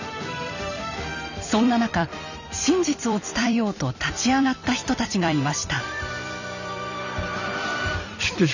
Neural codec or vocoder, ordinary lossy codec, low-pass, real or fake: vocoder, 44.1 kHz, 128 mel bands every 256 samples, BigVGAN v2; none; 7.2 kHz; fake